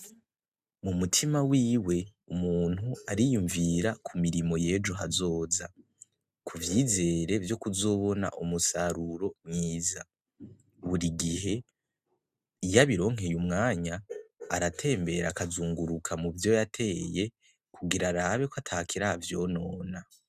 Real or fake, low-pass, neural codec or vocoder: real; 14.4 kHz; none